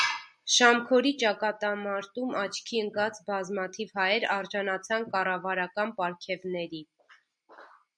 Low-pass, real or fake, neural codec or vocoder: 9.9 kHz; real; none